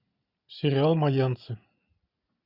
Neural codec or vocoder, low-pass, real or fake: none; 5.4 kHz; real